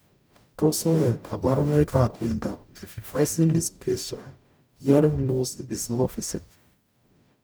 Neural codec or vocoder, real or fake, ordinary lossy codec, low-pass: codec, 44.1 kHz, 0.9 kbps, DAC; fake; none; none